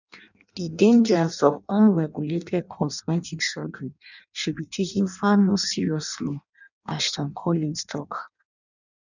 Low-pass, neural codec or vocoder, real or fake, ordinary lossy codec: 7.2 kHz; codec, 16 kHz in and 24 kHz out, 0.6 kbps, FireRedTTS-2 codec; fake; none